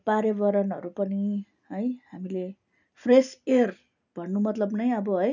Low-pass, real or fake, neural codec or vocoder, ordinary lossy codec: 7.2 kHz; real; none; none